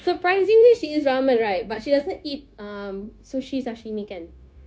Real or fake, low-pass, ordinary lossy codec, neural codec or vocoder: fake; none; none; codec, 16 kHz, 0.9 kbps, LongCat-Audio-Codec